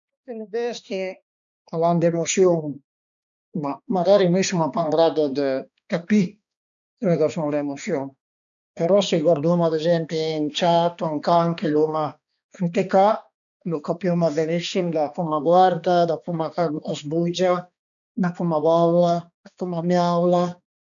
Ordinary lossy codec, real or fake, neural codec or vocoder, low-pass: none; fake; codec, 16 kHz, 2 kbps, X-Codec, HuBERT features, trained on balanced general audio; 7.2 kHz